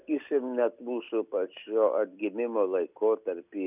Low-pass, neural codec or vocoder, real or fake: 3.6 kHz; none; real